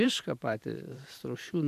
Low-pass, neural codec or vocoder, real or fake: 14.4 kHz; vocoder, 44.1 kHz, 128 mel bands every 512 samples, BigVGAN v2; fake